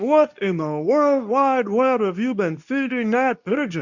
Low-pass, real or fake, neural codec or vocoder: 7.2 kHz; fake; codec, 24 kHz, 0.9 kbps, WavTokenizer, medium speech release version 2